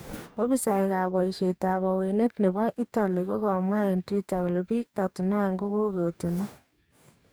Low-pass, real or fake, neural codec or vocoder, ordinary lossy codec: none; fake; codec, 44.1 kHz, 2.6 kbps, DAC; none